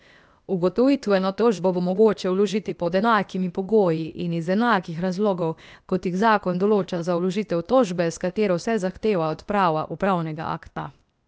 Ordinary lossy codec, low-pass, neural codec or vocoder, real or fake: none; none; codec, 16 kHz, 0.8 kbps, ZipCodec; fake